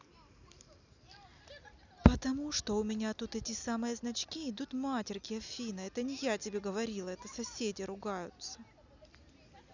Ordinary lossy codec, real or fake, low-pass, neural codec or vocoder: none; real; 7.2 kHz; none